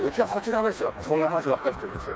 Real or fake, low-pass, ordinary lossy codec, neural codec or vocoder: fake; none; none; codec, 16 kHz, 2 kbps, FreqCodec, smaller model